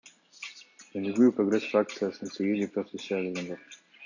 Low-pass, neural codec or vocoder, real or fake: 7.2 kHz; none; real